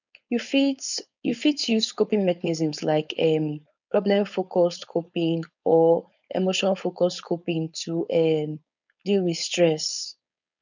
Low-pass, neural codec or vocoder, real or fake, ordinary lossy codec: 7.2 kHz; codec, 16 kHz, 4.8 kbps, FACodec; fake; none